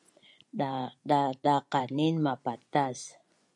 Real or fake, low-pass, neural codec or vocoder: fake; 10.8 kHz; vocoder, 24 kHz, 100 mel bands, Vocos